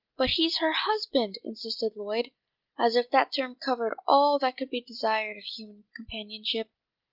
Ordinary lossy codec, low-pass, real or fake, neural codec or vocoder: Opus, 24 kbps; 5.4 kHz; real; none